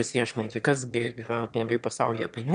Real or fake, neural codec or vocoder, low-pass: fake; autoencoder, 22.05 kHz, a latent of 192 numbers a frame, VITS, trained on one speaker; 9.9 kHz